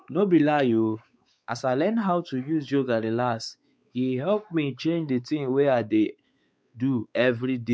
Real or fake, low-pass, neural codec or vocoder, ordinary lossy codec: fake; none; codec, 16 kHz, 4 kbps, X-Codec, WavLM features, trained on Multilingual LibriSpeech; none